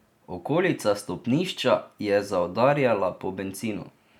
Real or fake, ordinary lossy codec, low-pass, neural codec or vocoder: real; none; 19.8 kHz; none